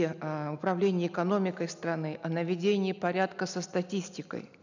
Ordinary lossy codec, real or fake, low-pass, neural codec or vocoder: none; real; 7.2 kHz; none